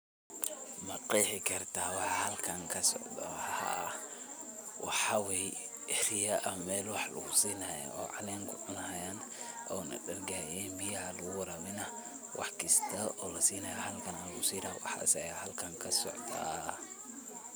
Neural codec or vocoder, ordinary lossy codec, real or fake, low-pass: none; none; real; none